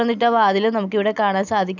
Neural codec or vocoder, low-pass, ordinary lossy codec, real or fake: none; 7.2 kHz; none; real